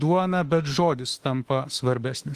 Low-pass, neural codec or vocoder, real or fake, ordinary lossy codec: 14.4 kHz; autoencoder, 48 kHz, 32 numbers a frame, DAC-VAE, trained on Japanese speech; fake; Opus, 24 kbps